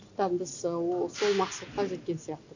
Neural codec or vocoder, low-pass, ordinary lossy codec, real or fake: none; 7.2 kHz; none; real